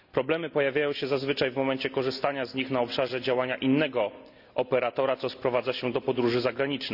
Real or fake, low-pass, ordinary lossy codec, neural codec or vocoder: real; 5.4 kHz; none; none